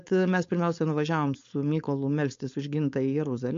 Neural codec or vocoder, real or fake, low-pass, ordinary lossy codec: codec, 16 kHz, 4.8 kbps, FACodec; fake; 7.2 kHz; MP3, 48 kbps